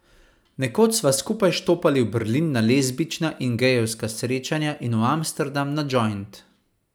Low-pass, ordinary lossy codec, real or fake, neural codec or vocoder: none; none; real; none